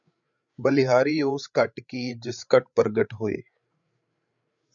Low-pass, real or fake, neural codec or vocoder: 7.2 kHz; fake; codec, 16 kHz, 8 kbps, FreqCodec, larger model